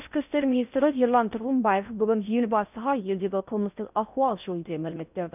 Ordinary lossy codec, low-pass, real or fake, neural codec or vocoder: none; 3.6 kHz; fake; codec, 16 kHz in and 24 kHz out, 0.6 kbps, FocalCodec, streaming, 4096 codes